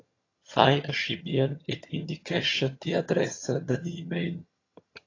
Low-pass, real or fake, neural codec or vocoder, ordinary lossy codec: 7.2 kHz; fake; vocoder, 22.05 kHz, 80 mel bands, HiFi-GAN; AAC, 32 kbps